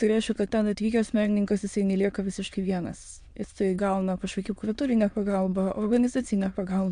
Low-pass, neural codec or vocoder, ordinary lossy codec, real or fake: 9.9 kHz; autoencoder, 22.05 kHz, a latent of 192 numbers a frame, VITS, trained on many speakers; MP3, 64 kbps; fake